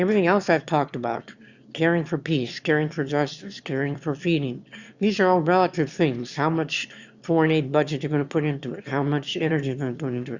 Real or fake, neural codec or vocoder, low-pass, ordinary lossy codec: fake; autoencoder, 22.05 kHz, a latent of 192 numbers a frame, VITS, trained on one speaker; 7.2 kHz; Opus, 64 kbps